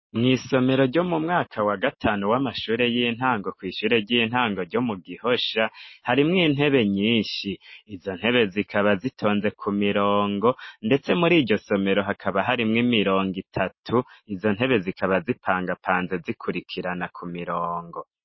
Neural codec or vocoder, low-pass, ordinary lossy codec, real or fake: none; 7.2 kHz; MP3, 24 kbps; real